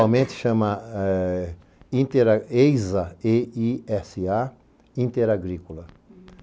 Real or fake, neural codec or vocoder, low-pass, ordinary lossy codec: real; none; none; none